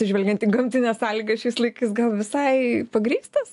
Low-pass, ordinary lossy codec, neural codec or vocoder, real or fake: 10.8 kHz; AAC, 64 kbps; none; real